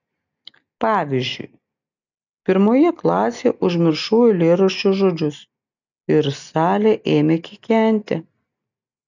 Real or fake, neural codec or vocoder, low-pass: real; none; 7.2 kHz